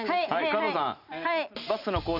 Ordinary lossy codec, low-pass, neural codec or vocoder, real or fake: none; 5.4 kHz; none; real